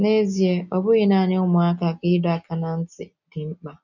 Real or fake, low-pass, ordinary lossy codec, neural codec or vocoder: real; 7.2 kHz; none; none